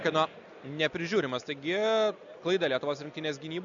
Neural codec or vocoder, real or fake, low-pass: none; real; 7.2 kHz